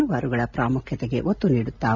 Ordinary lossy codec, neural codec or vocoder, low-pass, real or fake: none; none; 7.2 kHz; real